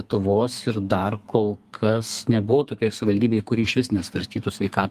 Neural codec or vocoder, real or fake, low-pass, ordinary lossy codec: codec, 32 kHz, 1.9 kbps, SNAC; fake; 14.4 kHz; Opus, 32 kbps